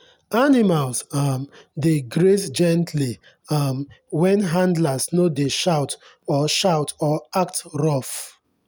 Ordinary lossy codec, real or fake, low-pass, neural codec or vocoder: none; real; none; none